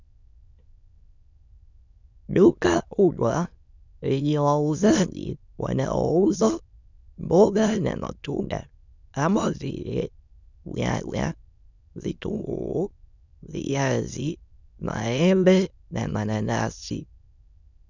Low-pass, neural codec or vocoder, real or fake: 7.2 kHz; autoencoder, 22.05 kHz, a latent of 192 numbers a frame, VITS, trained on many speakers; fake